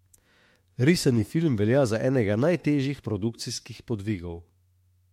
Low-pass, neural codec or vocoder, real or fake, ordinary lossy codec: 19.8 kHz; autoencoder, 48 kHz, 32 numbers a frame, DAC-VAE, trained on Japanese speech; fake; MP3, 64 kbps